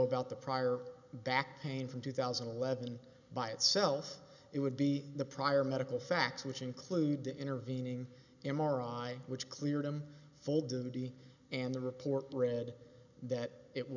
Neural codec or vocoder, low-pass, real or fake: none; 7.2 kHz; real